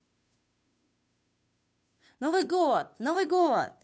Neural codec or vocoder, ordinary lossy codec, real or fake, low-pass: codec, 16 kHz, 2 kbps, FunCodec, trained on Chinese and English, 25 frames a second; none; fake; none